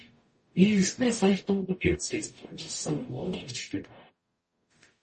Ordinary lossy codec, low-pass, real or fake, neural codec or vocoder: MP3, 32 kbps; 10.8 kHz; fake; codec, 44.1 kHz, 0.9 kbps, DAC